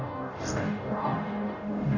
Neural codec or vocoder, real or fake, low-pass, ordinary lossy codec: codec, 44.1 kHz, 0.9 kbps, DAC; fake; 7.2 kHz; none